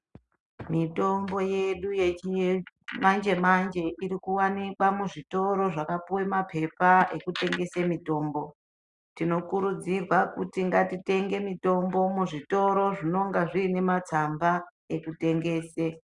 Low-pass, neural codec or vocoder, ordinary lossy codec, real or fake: 10.8 kHz; none; Opus, 64 kbps; real